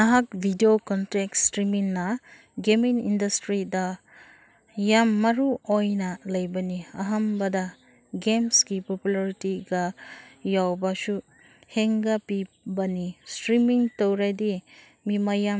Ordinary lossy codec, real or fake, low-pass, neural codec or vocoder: none; real; none; none